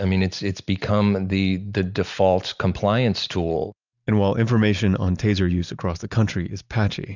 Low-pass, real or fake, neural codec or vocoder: 7.2 kHz; real; none